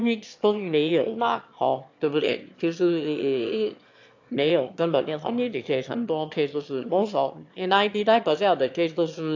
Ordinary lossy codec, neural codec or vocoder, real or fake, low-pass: AAC, 48 kbps; autoencoder, 22.05 kHz, a latent of 192 numbers a frame, VITS, trained on one speaker; fake; 7.2 kHz